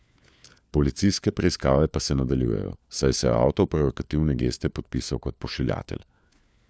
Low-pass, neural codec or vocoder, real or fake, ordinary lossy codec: none; codec, 16 kHz, 4 kbps, FunCodec, trained on LibriTTS, 50 frames a second; fake; none